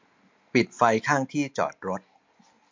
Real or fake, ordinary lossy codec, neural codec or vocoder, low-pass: real; MP3, 64 kbps; none; 7.2 kHz